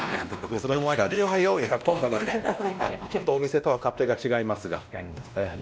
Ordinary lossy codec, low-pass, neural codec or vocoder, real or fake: none; none; codec, 16 kHz, 1 kbps, X-Codec, WavLM features, trained on Multilingual LibriSpeech; fake